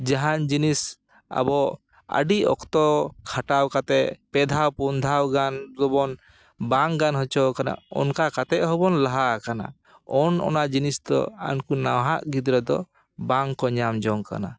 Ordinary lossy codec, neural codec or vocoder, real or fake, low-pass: none; none; real; none